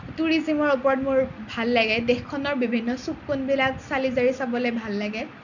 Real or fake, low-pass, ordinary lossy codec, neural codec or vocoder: real; 7.2 kHz; none; none